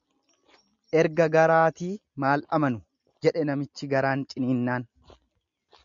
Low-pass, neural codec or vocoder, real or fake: 7.2 kHz; none; real